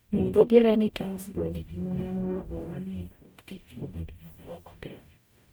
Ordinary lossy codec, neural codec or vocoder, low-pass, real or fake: none; codec, 44.1 kHz, 0.9 kbps, DAC; none; fake